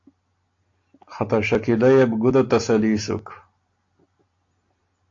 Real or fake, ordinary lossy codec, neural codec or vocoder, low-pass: real; AAC, 48 kbps; none; 7.2 kHz